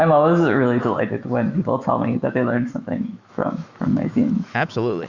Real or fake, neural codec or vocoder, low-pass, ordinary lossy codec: fake; autoencoder, 48 kHz, 128 numbers a frame, DAC-VAE, trained on Japanese speech; 7.2 kHz; Opus, 64 kbps